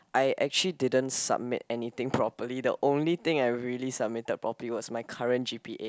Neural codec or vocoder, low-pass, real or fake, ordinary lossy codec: none; none; real; none